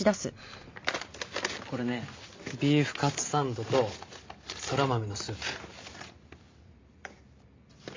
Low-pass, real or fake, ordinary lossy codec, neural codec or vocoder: 7.2 kHz; real; MP3, 48 kbps; none